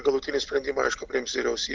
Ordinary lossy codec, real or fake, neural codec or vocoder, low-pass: Opus, 24 kbps; real; none; 7.2 kHz